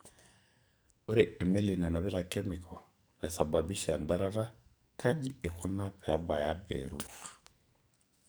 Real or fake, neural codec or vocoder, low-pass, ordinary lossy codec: fake; codec, 44.1 kHz, 2.6 kbps, SNAC; none; none